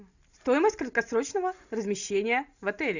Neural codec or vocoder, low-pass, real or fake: none; 7.2 kHz; real